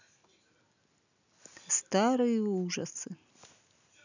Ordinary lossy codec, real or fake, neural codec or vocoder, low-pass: none; real; none; 7.2 kHz